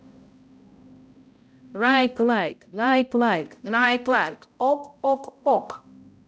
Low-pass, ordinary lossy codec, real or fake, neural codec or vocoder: none; none; fake; codec, 16 kHz, 0.5 kbps, X-Codec, HuBERT features, trained on balanced general audio